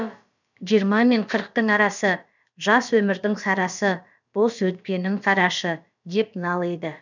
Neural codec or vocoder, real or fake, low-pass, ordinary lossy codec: codec, 16 kHz, about 1 kbps, DyCAST, with the encoder's durations; fake; 7.2 kHz; none